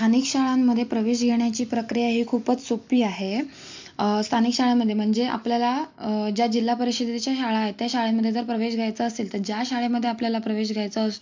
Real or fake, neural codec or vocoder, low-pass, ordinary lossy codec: real; none; 7.2 kHz; MP3, 64 kbps